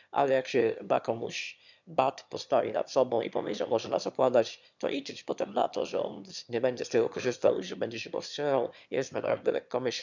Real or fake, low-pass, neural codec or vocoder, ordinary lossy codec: fake; 7.2 kHz; autoencoder, 22.05 kHz, a latent of 192 numbers a frame, VITS, trained on one speaker; none